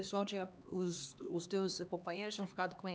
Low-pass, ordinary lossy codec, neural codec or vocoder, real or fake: none; none; codec, 16 kHz, 2 kbps, X-Codec, HuBERT features, trained on LibriSpeech; fake